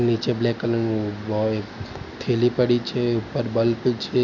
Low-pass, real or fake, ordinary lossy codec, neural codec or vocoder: 7.2 kHz; real; none; none